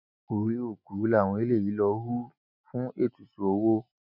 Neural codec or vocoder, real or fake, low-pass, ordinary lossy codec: none; real; 5.4 kHz; none